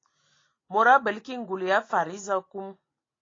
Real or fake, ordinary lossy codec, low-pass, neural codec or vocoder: real; AAC, 32 kbps; 7.2 kHz; none